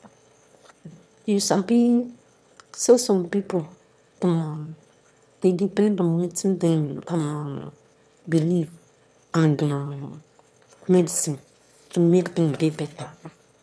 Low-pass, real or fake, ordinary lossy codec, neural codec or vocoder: none; fake; none; autoencoder, 22.05 kHz, a latent of 192 numbers a frame, VITS, trained on one speaker